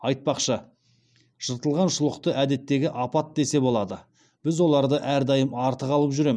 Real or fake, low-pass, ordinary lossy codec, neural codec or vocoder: real; none; none; none